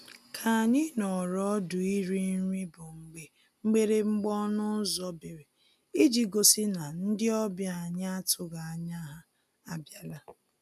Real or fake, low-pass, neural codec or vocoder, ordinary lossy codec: real; 14.4 kHz; none; none